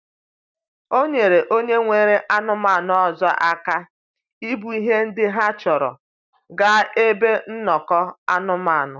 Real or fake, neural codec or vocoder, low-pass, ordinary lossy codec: real; none; 7.2 kHz; none